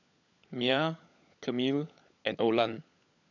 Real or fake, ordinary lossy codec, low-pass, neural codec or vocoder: fake; none; 7.2 kHz; codec, 16 kHz, 16 kbps, FunCodec, trained on LibriTTS, 50 frames a second